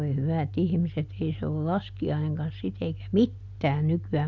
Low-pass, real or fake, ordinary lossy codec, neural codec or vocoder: 7.2 kHz; real; none; none